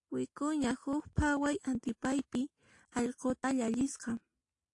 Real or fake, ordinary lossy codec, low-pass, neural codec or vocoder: real; AAC, 48 kbps; 10.8 kHz; none